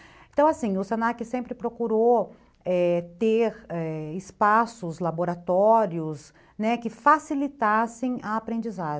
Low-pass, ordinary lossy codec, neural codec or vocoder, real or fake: none; none; none; real